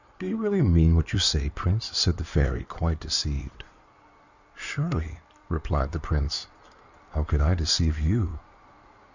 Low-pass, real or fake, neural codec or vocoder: 7.2 kHz; fake; codec, 16 kHz in and 24 kHz out, 2.2 kbps, FireRedTTS-2 codec